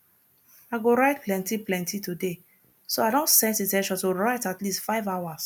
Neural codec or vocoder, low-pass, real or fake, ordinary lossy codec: none; none; real; none